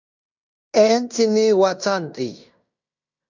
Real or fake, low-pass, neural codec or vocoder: fake; 7.2 kHz; codec, 16 kHz in and 24 kHz out, 0.9 kbps, LongCat-Audio-Codec, fine tuned four codebook decoder